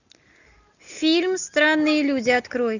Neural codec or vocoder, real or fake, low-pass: none; real; 7.2 kHz